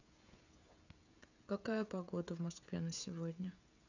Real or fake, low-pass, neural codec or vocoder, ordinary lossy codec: fake; 7.2 kHz; codec, 16 kHz, 16 kbps, FreqCodec, smaller model; none